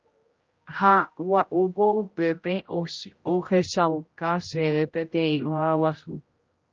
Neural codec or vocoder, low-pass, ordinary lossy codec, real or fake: codec, 16 kHz, 0.5 kbps, X-Codec, HuBERT features, trained on general audio; 7.2 kHz; Opus, 24 kbps; fake